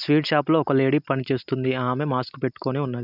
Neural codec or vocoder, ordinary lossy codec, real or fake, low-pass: none; none; real; 5.4 kHz